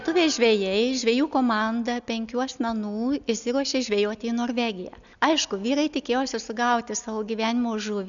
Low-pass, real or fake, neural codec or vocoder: 7.2 kHz; real; none